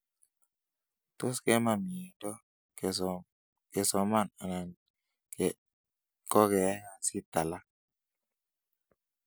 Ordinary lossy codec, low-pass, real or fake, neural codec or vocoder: none; none; real; none